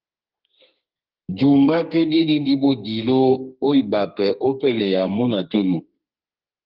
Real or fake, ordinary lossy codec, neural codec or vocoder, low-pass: fake; Opus, 32 kbps; codec, 32 kHz, 1.9 kbps, SNAC; 5.4 kHz